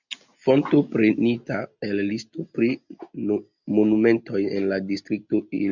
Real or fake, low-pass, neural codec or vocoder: real; 7.2 kHz; none